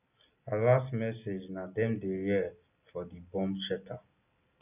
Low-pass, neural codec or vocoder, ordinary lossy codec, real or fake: 3.6 kHz; none; none; real